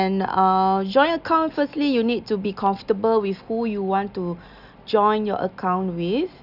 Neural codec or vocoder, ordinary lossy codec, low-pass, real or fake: none; none; 5.4 kHz; real